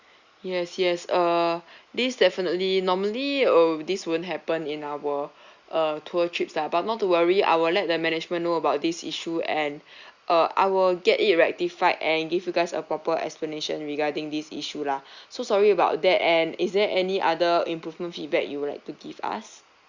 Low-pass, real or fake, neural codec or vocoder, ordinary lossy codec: 7.2 kHz; real; none; Opus, 64 kbps